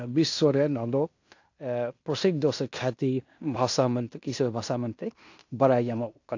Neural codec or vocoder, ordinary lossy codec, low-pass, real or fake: codec, 16 kHz in and 24 kHz out, 0.9 kbps, LongCat-Audio-Codec, fine tuned four codebook decoder; AAC, 48 kbps; 7.2 kHz; fake